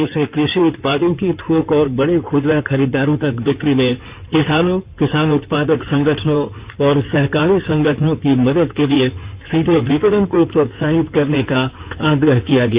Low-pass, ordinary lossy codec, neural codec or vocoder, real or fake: 3.6 kHz; Opus, 64 kbps; codec, 16 kHz in and 24 kHz out, 2.2 kbps, FireRedTTS-2 codec; fake